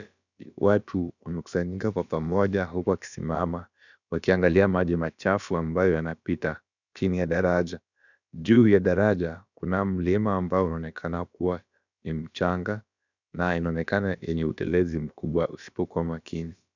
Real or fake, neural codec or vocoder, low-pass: fake; codec, 16 kHz, about 1 kbps, DyCAST, with the encoder's durations; 7.2 kHz